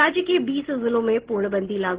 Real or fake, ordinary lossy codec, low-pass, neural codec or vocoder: real; Opus, 16 kbps; 3.6 kHz; none